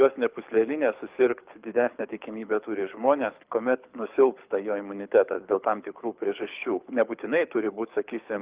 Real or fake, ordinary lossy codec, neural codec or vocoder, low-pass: fake; Opus, 32 kbps; codec, 24 kHz, 6 kbps, HILCodec; 3.6 kHz